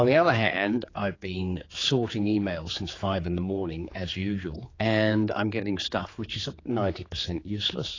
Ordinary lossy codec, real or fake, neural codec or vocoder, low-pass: AAC, 32 kbps; fake; codec, 16 kHz, 4 kbps, X-Codec, HuBERT features, trained on general audio; 7.2 kHz